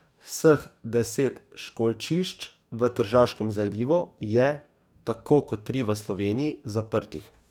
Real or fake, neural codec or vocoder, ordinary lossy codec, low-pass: fake; codec, 44.1 kHz, 2.6 kbps, DAC; none; 19.8 kHz